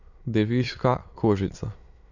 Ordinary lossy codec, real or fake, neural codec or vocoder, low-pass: none; fake; autoencoder, 22.05 kHz, a latent of 192 numbers a frame, VITS, trained on many speakers; 7.2 kHz